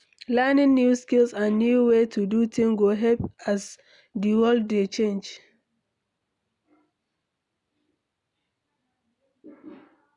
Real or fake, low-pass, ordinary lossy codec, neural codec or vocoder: real; 10.8 kHz; none; none